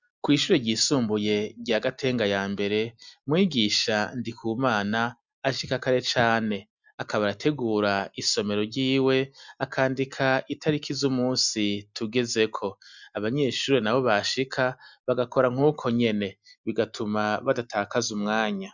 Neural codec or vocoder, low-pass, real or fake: none; 7.2 kHz; real